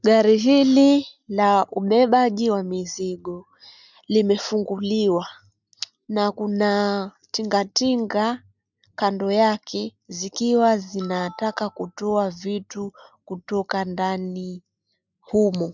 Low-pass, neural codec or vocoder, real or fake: 7.2 kHz; none; real